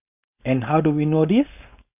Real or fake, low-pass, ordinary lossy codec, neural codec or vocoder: fake; 3.6 kHz; none; codec, 16 kHz, 4.8 kbps, FACodec